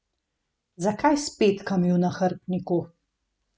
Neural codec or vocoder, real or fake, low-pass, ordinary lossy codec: none; real; none; none